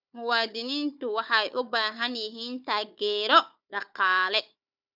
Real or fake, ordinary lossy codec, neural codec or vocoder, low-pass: fake; none; codec, 16 kHz, 4 kbps, FunCodec, trained on Chinese and English, 50 frames a second; 5.4 kHz